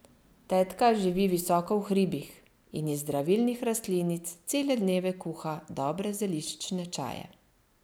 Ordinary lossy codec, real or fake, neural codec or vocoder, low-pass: none; real; none; none